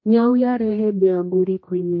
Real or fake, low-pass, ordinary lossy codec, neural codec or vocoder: fake; 7.2 kHz; MP3, 32 kbps; codec, 16 kHz, 1 kbps, X-Codec, HuBERT features, trained on general audio